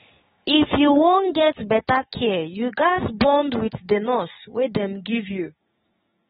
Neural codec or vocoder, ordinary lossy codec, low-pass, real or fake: codec, 16 kHz, 6 kbps, DAC; AAC, 16 kbps; 7.2 kHz; fake